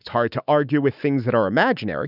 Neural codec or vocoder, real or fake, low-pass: autoencoder, 48 kHz, 128 numbers a frame, DAC-VAE, trained on Japanese speech; fake; 5.4 kHz